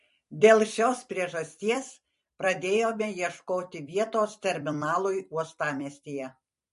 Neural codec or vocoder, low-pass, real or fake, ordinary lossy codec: vocoder, 44.1 kHz, 128 mel bands every 256 samples, BigVGAN v2; 14.4 kHz; fake; MP3, 48 kbps